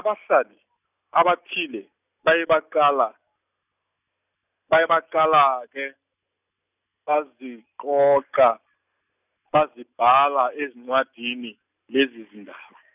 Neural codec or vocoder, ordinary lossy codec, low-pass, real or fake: none; none; 3.6 kHz; real